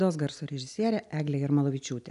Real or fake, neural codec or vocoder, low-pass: real; none; 10.8 kHz